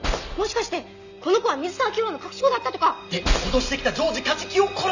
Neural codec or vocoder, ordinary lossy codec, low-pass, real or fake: none; none; 7.2 kHz; real